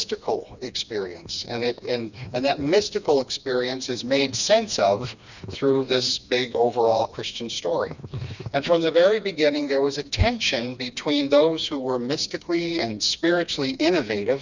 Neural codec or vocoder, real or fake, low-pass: codec, 16 kHz, 2 kbps, FreqCodec, smaller model; fake; 7.2 kHz